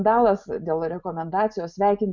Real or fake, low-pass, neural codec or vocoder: real; 7.2 kHz; none